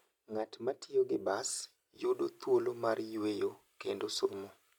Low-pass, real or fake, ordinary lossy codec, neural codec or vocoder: 19.8 kHz; real; none; none